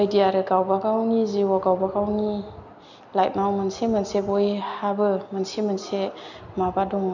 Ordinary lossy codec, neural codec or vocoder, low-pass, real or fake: none; none; 7.2 kHz; real